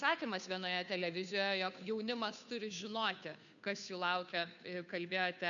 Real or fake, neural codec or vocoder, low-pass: fake; codec, 16 kHz, 2 kbps, FunCodec, trained on Chinese and English, 25 frames a second; 7.2 kHz